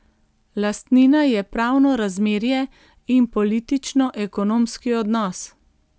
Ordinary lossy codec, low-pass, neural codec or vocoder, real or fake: none; none; none; real